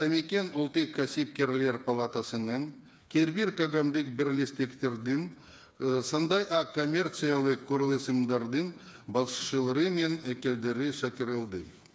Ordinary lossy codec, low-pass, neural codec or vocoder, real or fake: none; none; codec, 16 kHz, 4 kbps, FreqCodec, smaller model; fake